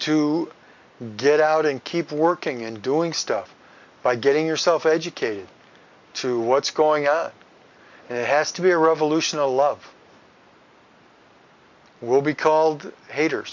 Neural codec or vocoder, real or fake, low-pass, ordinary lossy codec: none; real; 7.2 kHz; MP3, 64 kbps